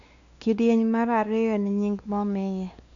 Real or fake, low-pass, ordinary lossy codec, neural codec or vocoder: fake; 7.2 kHz; none; codec, 16 kHz, 2 kbps, X-Codec, WavLM features, trained on Multilingual LibriSpeech